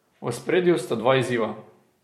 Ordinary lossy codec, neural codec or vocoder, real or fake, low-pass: MP3, 64 kbps; vocoder, 44.1 kHz, 128 mel bands every 256 samples, BigVGAN v2; fake; 19.8 kHz